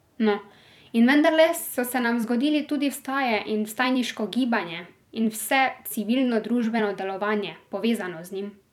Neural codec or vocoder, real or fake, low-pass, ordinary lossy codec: vocoder, 44.1 kHz, 128 mel bands every 256 samples, BigVGAN v2; fake; 19.8 kHz; none